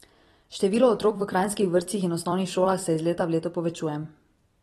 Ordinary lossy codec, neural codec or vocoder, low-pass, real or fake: AAC, 32 kbps; vocoder, 44.1 kHz, 128 mel bands every 256 samples, BigVGAN v2; 19.8 kHz; fake